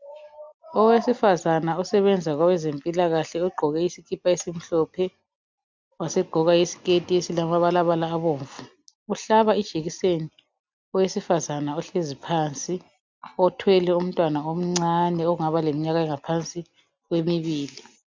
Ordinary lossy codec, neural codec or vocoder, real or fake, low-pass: MP3, 64 kbps; none; real; 7.2 kHz